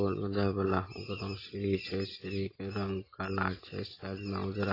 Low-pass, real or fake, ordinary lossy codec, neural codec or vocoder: 5.4 kHz; real; AAC, 24 kbps; none